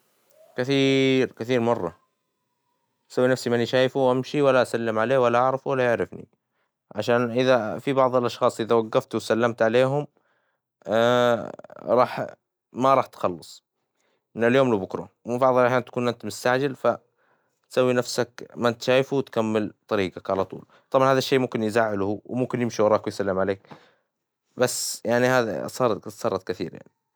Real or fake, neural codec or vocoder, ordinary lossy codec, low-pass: real; none; none; none